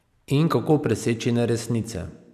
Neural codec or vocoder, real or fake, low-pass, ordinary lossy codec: none; real; 14.4 kHz; none